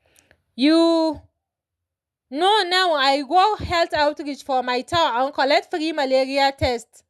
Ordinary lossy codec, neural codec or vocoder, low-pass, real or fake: none; none; none; real